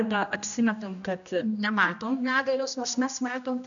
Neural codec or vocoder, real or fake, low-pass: codec, 16 kHz, 1 kbps, X-Codec, HuBERT features, trained on general audio; fake; 7.2 kHz